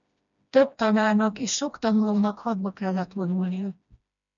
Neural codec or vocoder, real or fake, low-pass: codec, 16 kHz, 1 kbps, FreqCodec, smaller model; fake; 7.2 kHz